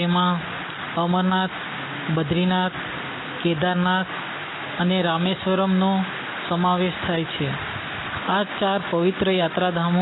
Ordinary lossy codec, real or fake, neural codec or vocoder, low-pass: AAC, 16 kbps; real; none; 7.2 kHz